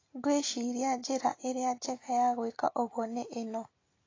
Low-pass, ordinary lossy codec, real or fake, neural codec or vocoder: 7.2 kHz; AAC, 32 kbps; real; none